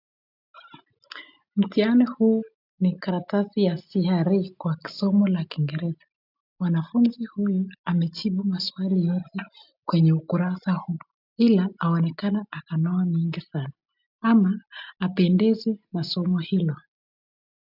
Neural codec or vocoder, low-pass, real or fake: none; 5.4 kHz; real